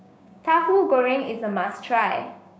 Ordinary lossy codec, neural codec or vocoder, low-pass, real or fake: none; codec, 16 kHz, 6 kbps, DAC; none; fake